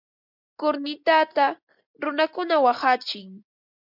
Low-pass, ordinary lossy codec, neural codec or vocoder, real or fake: 5.4 kHz; MP3, 48 kbps; none; real